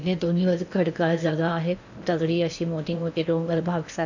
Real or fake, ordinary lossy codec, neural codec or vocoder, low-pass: fake; none; codec, 16 kHz in and 24 kHz out, 0.8 kbps, FocalCodec, streaming, 65536 codes; 7.2 kHz